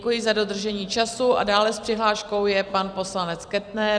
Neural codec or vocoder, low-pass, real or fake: vocoder, 44.1 kHz, 128 mel bands every 256 samples, BigVGAN v2; 9.9 kHz; fake